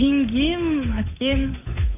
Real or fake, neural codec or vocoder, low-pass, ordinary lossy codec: fake; codec, 16 kHz, 8 kbps, FunCodec, trained on Chinese and English, 25 frames a second; 3.6 kHz; AAC, 24 kbps